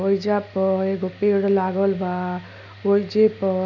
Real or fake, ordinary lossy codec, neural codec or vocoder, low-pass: real; none; none; 7.2 kHz